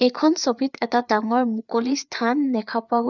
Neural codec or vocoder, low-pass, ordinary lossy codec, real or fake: codec, 16 kHz, 4 kbps, FreqCodec, larger model; 7.2 kHz; none; fake